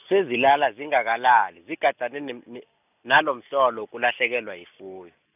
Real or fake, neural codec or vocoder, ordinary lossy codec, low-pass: real; none; none; 3.6 kHz